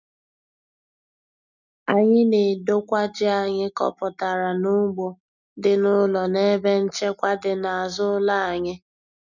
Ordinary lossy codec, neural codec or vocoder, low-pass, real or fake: none; none; 7.2 kHz; real